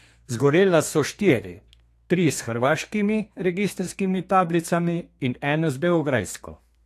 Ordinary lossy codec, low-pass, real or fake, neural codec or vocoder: AAC, 64 kbps; 14.4 kHz; fake; codec, 32 kHz, 1.9 kbps, SNAC